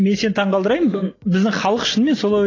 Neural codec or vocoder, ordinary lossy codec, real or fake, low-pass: none; AAC, 32 kbps; real; 7.2 kHz